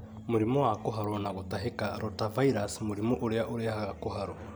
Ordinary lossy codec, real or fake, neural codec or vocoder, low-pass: none; real; none; none